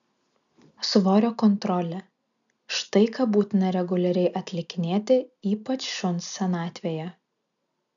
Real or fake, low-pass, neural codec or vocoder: real; 7.2 kHz; none